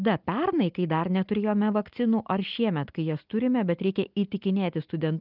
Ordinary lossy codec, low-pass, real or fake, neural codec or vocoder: Opus, 24 kbps; 5.4 kHz; real; none